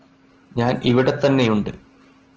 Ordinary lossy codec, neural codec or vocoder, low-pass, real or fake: Opus, 16 kbps; none; 7.2 kHz; real